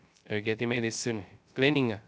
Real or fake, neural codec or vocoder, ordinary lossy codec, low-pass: fake; codec, 16 kHz, 0.3 kbps, FocalCodec; none; none